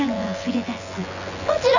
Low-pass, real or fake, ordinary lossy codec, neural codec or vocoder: 7.2 kHz; fake; none; vocoder, 24 kHz, 100 mel bands, Vocos